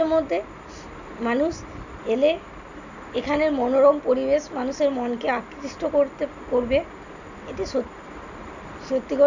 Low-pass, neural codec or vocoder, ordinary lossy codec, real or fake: 7.2 kHz; none; none; real